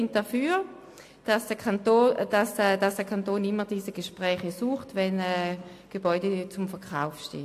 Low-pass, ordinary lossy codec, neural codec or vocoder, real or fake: 14.4 kHz; AAC, 64 kbps; none; real